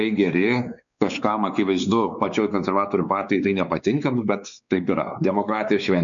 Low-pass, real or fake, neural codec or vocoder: 7.2 kHz; fake; codec, 16 kHz, 4 kbps, X-Codec, WavLM features, trained on Multilingual LibriSpeech